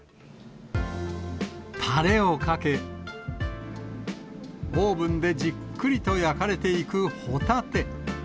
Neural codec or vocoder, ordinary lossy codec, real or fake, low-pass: none; none; real; none